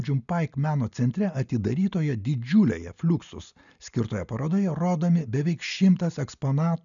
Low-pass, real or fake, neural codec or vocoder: 7.2 kHz; real; none